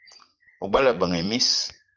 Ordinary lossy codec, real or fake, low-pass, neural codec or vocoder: Opus, 24 kbps; fake; 7.2 kHz; vocoder, 44.1 kHz, 80 mel bands, Vocos